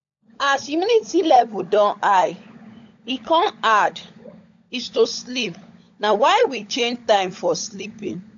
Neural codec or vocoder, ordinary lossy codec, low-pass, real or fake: codec, 16 kHz, 16 kbps, FunCodec, trained on LibriTTS, 50 frames a second; AAC, 64 kbps; 7.2 kHz; fake